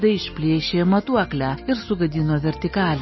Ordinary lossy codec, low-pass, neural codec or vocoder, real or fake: MP3, 24 kbps; 7.2 kHz; none; real